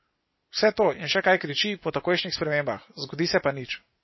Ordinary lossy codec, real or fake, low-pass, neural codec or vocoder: MP3, 24 kbps; real; 7.2 kHz; none